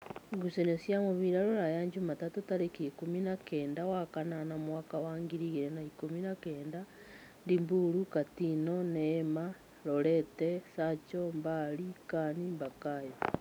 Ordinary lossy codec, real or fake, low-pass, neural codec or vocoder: none; real; none; none